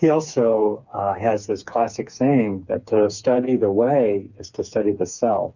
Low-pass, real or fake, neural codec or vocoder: 7.2 kHz; fake; codec, 16 kHz, 4 kbps, FreqCodec, smaller model